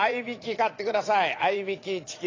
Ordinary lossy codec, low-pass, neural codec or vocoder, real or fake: AAC, 32 kbps; 7.2 kHz; vocoder, 22.05 kHz, 80 mel bands, Vocos; fake